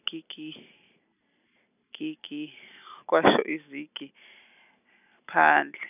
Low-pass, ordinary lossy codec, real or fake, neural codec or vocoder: 3.6 kHz; none; real; none